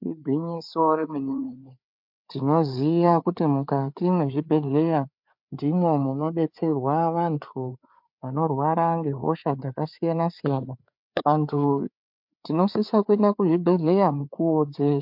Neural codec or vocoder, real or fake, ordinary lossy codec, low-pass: codec, 16 kHz, 4 kbps, FreqCodec, larger model; fake; MP3, 48 kbps; 5.4 kHz